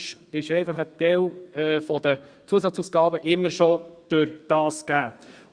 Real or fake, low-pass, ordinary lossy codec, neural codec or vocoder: fake; 9.9 kHz; Opus, 64 kbps; codec, 32 kHz, 1.9 kbps, SNAC